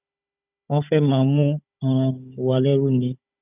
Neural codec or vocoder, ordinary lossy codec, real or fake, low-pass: codec, 16 kHz, 16 kbps, FunCodec, trained on Chinese and English, 50 frames a second; none; fake; 3.6 kHz